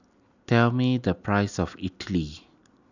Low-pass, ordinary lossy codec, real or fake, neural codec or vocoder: 7.2 kHz; none; real; none